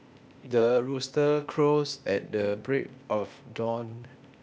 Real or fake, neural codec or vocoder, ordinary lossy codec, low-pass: fake; codec, 16 kHz, 0.8 kbps, ZipCodec; none; none